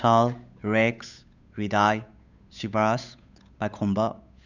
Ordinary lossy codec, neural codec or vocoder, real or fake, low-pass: none; codec, 16 kHz, 8 kbps, FunCodec, trained on Chinese and English, 25 frames a second; fake; 7.2 kHz